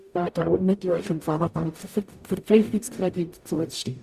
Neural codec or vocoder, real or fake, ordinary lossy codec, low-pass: codec, 44.1 kHz, 0.9 kbps, DAC; fake; Opus, 64 kbps; 14.4 kHz